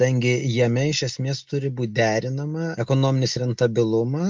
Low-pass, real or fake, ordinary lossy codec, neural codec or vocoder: 7.2 kHz; real; Opus, 32 kbps; none